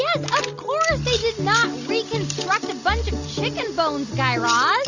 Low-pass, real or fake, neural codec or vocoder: 7.2 kHz; real; none